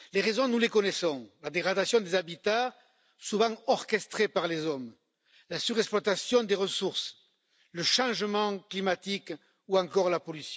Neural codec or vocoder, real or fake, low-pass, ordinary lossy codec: none; real; none; none